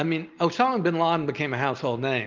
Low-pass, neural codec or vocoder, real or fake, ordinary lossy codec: 7.2 kHz; none; real; Opus, 24 kbps